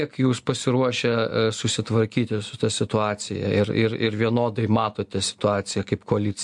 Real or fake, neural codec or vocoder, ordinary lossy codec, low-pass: real; none; MP3, 64 kbps; 10.8 kHz